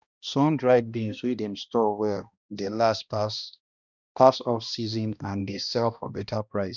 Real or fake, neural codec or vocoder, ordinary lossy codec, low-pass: fake; codec, 16 kHz, 1 kbps, X-Codec, HuBERT features, trained on balanced general audio; none; 7.2 kHz